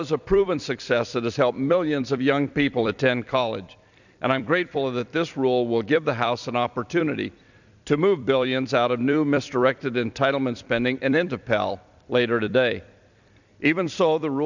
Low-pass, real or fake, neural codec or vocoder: 7.2 kHz; fake; vocoder, 44.1 kHz, 128 mel bands every 256 samples, BigVGAN v2